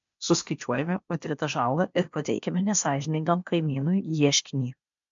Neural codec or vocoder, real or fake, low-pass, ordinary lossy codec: codec, 16 kHz, 0.8 kbps, ZipCodec; fake; 7.2 kHz; MP3, 64 kbps